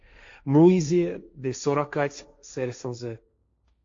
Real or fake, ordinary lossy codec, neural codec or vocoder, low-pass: fake; AAC, 48 kbps; codec, 16 kHz, 1.1 kbps, Voila-Tokenizer; 7.2 kHz